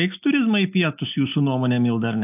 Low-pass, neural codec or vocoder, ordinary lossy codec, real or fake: 3.6 kHz; none; AAC, 32 kbps; real